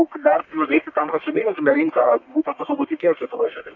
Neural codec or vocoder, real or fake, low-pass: codec, 44.1 kHz, 1.7 kbps, Pupu-Codec; fake; 7.2 kHz